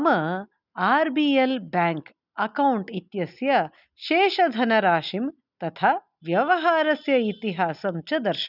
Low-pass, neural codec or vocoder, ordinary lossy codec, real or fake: 5.4 kHz; none; none; real